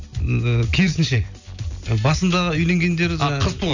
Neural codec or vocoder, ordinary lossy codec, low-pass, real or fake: none; none; 7.2 kHz; real